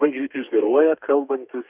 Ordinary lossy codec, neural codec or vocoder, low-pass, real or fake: Opus, 64 kbps; codec, 32 kHz, 1.9 kbps, SNAC; 3.6 kHz; fake